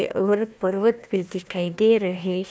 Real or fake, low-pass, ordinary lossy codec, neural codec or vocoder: fake; none; none; codec, 16 kHz, 1 kbps, FunCodec, trained on Chinese and English, 50 frames a second